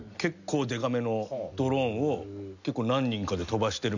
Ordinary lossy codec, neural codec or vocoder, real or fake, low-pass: none; none; real; 7.2 kHz